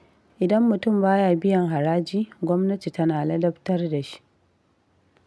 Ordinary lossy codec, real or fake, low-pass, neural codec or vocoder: none; real; none; none